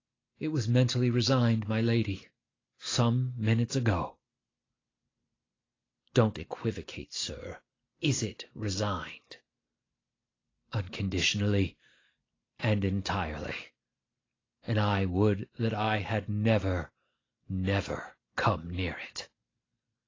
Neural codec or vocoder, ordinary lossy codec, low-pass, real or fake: none; AAC, 32 kbps; 7.2 kHz; real